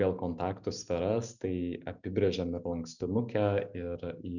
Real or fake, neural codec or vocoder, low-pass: real; none; 7.2 kHz